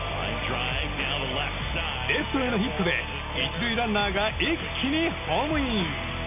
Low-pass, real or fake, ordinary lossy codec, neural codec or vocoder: 3.6 kHz; real; none; none